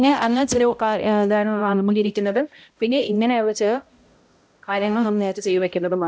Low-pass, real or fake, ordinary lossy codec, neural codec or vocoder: none; fake; none; codec, 16 kHz, 0.5 kbps, X-Codec, HuBERT features, trained on balanced general audio